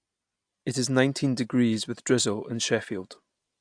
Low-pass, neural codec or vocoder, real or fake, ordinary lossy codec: 9.9 kHz; none; real; AAC, 64 kbps